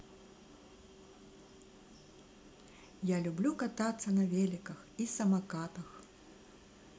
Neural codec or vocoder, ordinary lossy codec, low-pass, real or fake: none; none; none; real